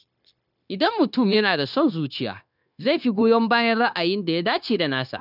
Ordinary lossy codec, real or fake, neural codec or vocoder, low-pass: none; fake; codec, 16 kHz, 0.9 kbps, LongCat-Audio-Codec; 5.4 kHz